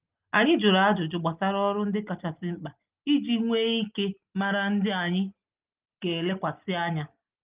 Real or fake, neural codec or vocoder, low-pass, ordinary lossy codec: real; none; 3.6 kHz; Opus, 24 kbps